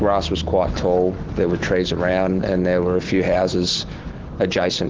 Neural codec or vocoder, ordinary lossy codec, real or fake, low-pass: autoencoder, 48 kHz, 128 numbers a frame, DAC-VAE, trained on Japanese speech; Opus, 16 kbps; fake; 7.2 kHz